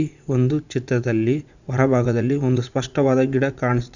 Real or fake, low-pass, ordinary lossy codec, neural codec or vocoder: fake; 7.2 kHz; none; vocoder, 44.1 kHz, 80 mel bands, Vocos